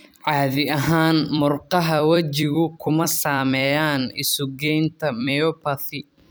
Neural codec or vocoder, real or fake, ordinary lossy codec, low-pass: vocoder, 44.1 kHz, 128 mel bands every 256 samples, BigVGAN v2; fake; none; none